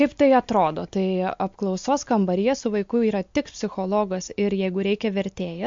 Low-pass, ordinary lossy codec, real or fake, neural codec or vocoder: 7.2 kHz; MP3, 48 kbps; real; none